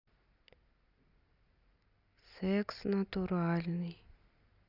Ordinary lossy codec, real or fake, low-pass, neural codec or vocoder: Opus, 64 kbps; real; 5.4 kHz; none